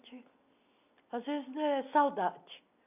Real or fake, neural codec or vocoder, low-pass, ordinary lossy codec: real; none; 3.6 kHz; none